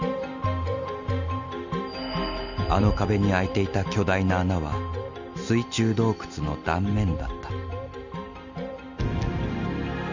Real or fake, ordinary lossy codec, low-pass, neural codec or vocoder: real; Opus, 64 kbps; 7.2 kHz; none